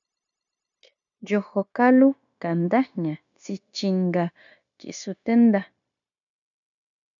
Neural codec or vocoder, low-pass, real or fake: codec, 16 kHz, 0.9 kbps, LongCat-Audio-Codec; 7.2 kHz; fake